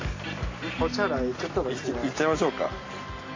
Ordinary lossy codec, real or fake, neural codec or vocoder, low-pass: AAC, 32 kbps; real; none; 7.2 kHz